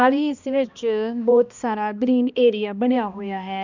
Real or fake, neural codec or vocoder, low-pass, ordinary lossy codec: fake; codec, 16 kHz, 1 kbps, X-Codec, HuBERT features, trained on balanced general audio; 7.2 kHz; none